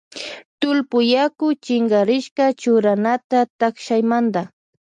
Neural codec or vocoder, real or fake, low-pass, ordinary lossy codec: none; real; 10.8 kHz; MP3, 96 kbps